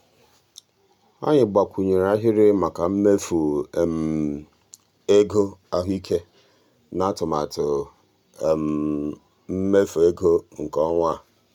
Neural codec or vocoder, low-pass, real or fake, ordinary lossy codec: none; 19.8 kHz; real; none